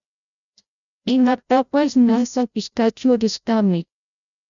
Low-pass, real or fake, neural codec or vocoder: 7.2 kHz; fake; codec, 16 kHz, 0.5 kbps, FreqCodec, larger model